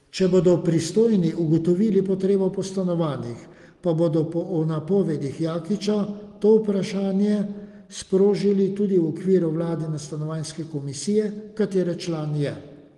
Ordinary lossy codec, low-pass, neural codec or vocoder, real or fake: Opus, 24 kbps; 10.8 kHz; none; real